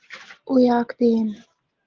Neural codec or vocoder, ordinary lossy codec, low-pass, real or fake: none; Opus, 16 kbps; 7.2 kHz; real